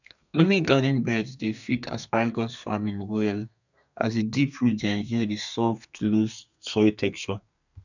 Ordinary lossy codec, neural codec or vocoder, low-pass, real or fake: none; codec, 32 kHz, 1.9 kbps, SNAC; 7.2 kHz; fake